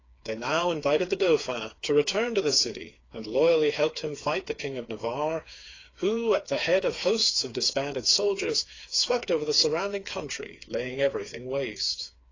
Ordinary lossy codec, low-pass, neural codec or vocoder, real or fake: AAC, 32 kbps; 7.2 kHz; codec, 16 kHz, 4 kbps, FreqCodec, smaller model; fake